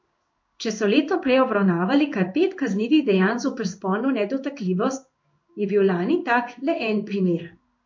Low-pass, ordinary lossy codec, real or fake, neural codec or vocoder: 7.2 kHz; MP3, 48 kbps; fake; codec, 16 kHz in and 24 kHz out, 1 kbps, XY-Tokenizer